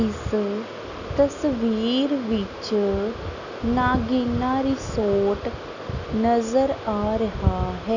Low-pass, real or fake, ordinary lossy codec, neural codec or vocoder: 7.2 kHz; real; none; none